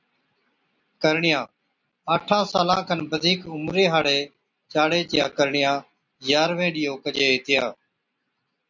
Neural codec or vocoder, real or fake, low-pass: none; real; 7.2 kHz